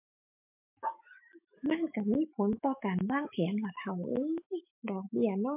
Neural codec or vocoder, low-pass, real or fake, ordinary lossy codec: autoencoder, 48 kHz, 128 numbers a frame, DAC-VAE, trained on Japanese speech; 3.6 kHz; fake; MP3, 32 kbps